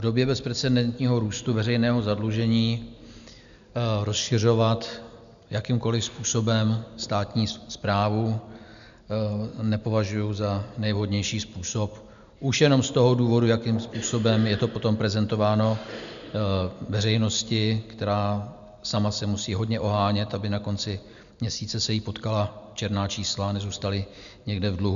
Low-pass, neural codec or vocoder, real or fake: 7.2 kHz; none; real